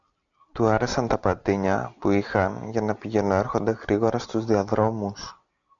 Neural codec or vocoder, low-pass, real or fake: none; 7.2 kHz; real